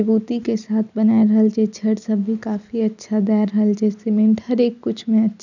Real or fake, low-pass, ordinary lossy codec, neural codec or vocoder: real; 7.2 kHz; none; none